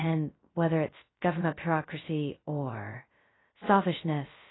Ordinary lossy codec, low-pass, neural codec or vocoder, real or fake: AAC, 16 kbps; 7.2 kHz; codec, 16 kHz, 0.2 kbps, FocalCodec; fake